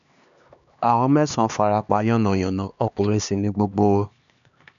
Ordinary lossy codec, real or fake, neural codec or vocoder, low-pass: none; fake; codec, 16 kHz, 2 kbps, X-Codec, HuBERT features, trained on LibriSpeech; 7.2 kHz